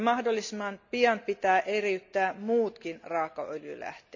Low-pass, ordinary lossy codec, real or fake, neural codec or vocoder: 7.2 kHz; none; real; none